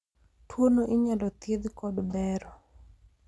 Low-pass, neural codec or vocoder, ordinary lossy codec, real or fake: none; none; none; real